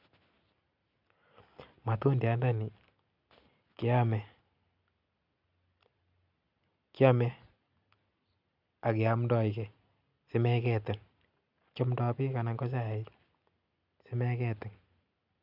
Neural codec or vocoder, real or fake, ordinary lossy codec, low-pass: none; real; none; 5.4 kHz